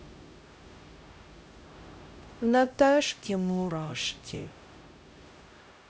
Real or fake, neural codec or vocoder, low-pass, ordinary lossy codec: fake; codec, 16 kHz, 0.5 kbps, X-Codec, HuBERT features, trained on LibriSpeech; none; none